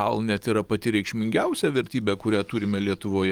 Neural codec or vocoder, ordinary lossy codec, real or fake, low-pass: codec, 44.1 kHz, 7.8 kbps, DAC; Opus, 32 kbps; fake; 19.8 kHz